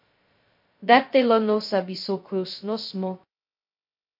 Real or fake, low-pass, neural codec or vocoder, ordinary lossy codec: fake; 5.4 kHz; codec, 16 kHz, 0.2 kbps, FocalCodec; MP3, 32 kbps